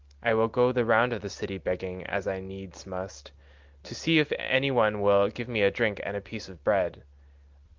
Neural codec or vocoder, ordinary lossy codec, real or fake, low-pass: none; Opus, 32 kbps; real; 7.2 kHz